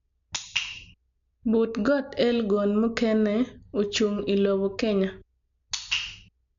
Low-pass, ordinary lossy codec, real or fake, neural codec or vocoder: 7.2 kHz; MP3, 64 kbps; real; none